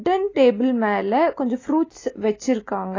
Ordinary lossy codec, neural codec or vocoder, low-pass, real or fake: AAC, 32 kbps; none; 7.2 kHz; real